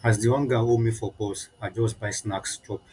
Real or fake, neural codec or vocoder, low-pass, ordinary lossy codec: real; none; 10.8 kHz; none